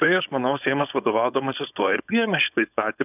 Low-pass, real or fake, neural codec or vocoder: 3.6 kHz; fake; codec, 16 kHz, 4.8 kbps, FACodec